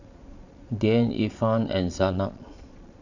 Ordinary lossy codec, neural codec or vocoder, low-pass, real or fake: none; vocoder, 22.05 kHz, 80 mel bands, Vocos; 7.2 kHz; fake